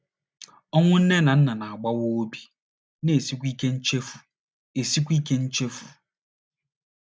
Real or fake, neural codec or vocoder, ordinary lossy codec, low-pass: real; none; none; none